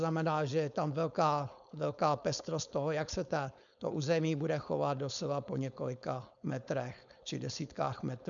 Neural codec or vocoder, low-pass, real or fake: codec, 16 kHz, 4.8 kbps, FACodec; 7.2 kHz; fake